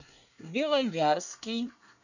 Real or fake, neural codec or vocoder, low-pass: fake; codec, 24 kHz, 1 kbps, SNAC; 7.2 kHz